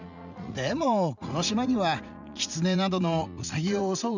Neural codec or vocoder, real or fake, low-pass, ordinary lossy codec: vocoder, 44.1 kHz, 80 mel bands, Vocos; fake; 7.2 kHz; none